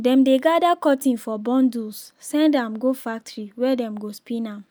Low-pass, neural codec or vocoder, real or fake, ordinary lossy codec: none; none; real; none